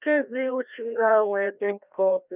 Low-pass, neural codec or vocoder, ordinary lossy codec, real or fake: 3.6 kHz; codec, 16 kHz, 1 kbps, FreqCodec, larger model; none; fake